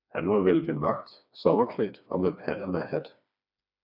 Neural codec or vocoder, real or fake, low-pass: codec, 16 kHz, 2 kbps, FreqCodec, smaller model; fake; 5.4 kHz